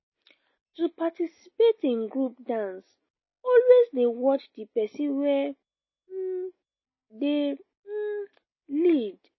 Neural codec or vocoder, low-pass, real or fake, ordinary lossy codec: none; 7.2 kHz; real; MP3, 24 kbps